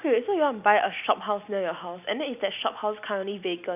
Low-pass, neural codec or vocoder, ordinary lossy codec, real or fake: 3.6 kHz; none; none; real